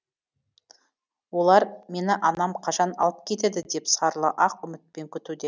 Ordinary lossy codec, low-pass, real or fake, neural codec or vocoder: none; none; real; none